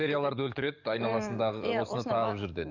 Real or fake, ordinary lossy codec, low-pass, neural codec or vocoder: fake; none; 7.2 kHz; codec, 44.1 kHz, 7.8 kbps, DAC